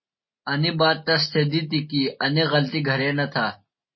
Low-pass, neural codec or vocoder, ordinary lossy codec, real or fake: 7.2 kHz; none; MP3, 24 kbps; real